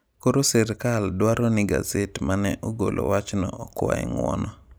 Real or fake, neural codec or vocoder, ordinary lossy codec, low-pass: real; none; none; none